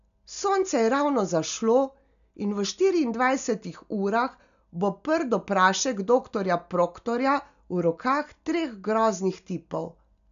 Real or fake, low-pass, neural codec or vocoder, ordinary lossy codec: real; 7.2 kHz; none; none